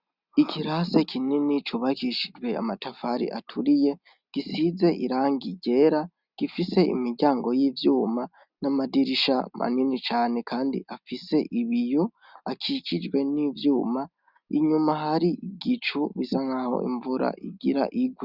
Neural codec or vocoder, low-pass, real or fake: none; 5.4 kHz; real